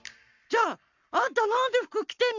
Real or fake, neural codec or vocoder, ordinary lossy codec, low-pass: fake; codec, 44.1 kHz, 7.8 kbps, Pupu-Codec; none; 7.2 kHz